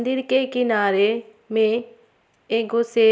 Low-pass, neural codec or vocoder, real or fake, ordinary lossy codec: none; none; real; none